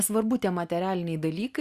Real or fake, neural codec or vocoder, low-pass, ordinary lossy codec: real; none; 14.4 kHz; Opus, 64 kbps